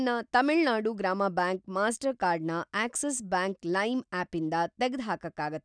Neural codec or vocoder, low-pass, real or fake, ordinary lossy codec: none; 9.9 kHz; real; none